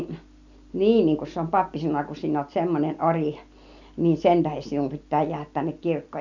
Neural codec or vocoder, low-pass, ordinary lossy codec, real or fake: none; 7.2 kHz; none; real